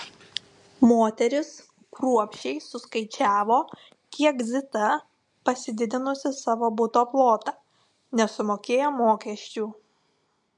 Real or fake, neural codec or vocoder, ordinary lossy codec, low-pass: real; none; MP3, 64 kbps; 10.8 kHz